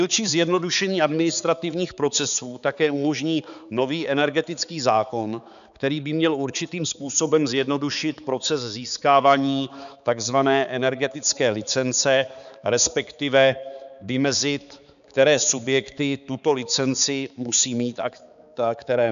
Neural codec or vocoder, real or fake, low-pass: codec, 16 kHz, 4 kbps, X-Codec, HuBERT features, trained on balanced general audio; fake; 7.2 kHz